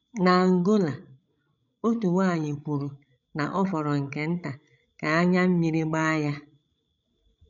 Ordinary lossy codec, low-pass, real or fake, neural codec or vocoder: none; 7.2 kHz; fake; codec, 16 kHz, 16 kbps, FreqCodec, larger model